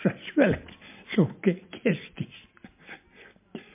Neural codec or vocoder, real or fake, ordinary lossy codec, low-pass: none; real; MP3, 24 kbps; 3.6 kHz